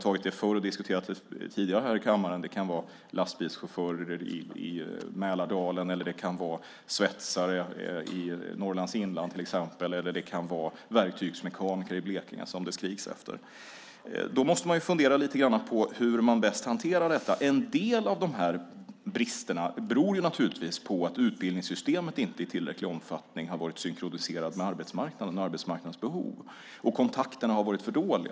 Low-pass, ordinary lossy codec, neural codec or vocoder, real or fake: none; none; none; real